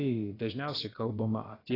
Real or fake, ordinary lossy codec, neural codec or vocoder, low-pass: fake; AAC, 24 kbps; codec, 16 kHz, about 1 kbps, DyCAST, with the encoder's durations; 5.4 kHz